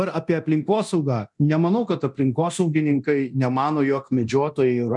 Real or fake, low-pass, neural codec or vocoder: fake; 10.8 kHz; codec, 24 kHz, 0.9 kbps, DualCodec